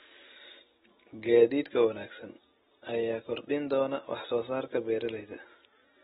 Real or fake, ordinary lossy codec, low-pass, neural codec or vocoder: real; AAC, 16 kbps; 19.8 kHz; none